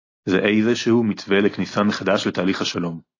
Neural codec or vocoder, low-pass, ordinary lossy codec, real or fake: none; 7.2 kHz; AAC, 32 kbps; real